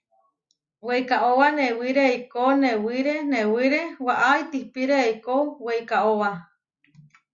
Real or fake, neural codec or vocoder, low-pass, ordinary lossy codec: real; none; 7.2 kHz; Opus, 64 kbps